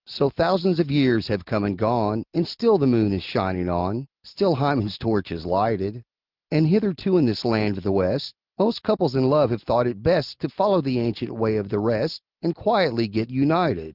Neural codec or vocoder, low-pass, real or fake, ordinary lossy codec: none; 5.4 kHz; real; Opus, 32 kbps